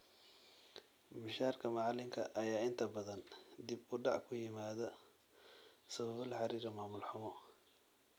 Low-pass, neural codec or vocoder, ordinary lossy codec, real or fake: none; none; none; real